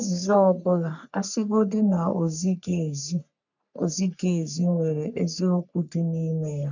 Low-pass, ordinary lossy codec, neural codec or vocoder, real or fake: 7.2 kHz; none; codec, 44.1 kHz, 3.4 kbps, Pupu-Codec; fake